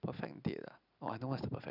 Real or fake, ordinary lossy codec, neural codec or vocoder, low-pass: real; none; none; 5.4 kHz